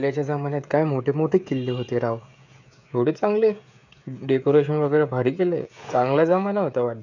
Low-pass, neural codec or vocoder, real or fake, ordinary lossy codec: 7.2 kHz; codec, 16 kHz, 16 kbps, FreqCodec, smaller model; fake; none